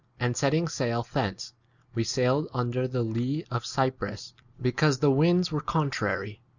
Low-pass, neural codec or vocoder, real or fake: 7.2 kHz; none; real